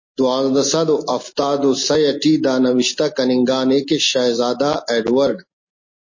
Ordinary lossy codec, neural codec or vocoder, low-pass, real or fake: MP3, 32 kbps; none; 7.2 kHz; real